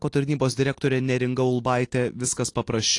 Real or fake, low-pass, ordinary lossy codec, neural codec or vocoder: real; 10.8 kHz; AAC, 48 kbps; none